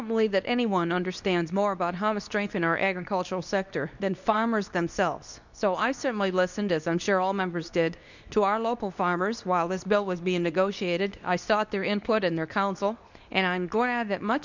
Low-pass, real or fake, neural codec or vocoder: 7.2 kHz; fake; codec, 24 kHz, 0.9 kbps, WavTokenizer, medium speech release version 2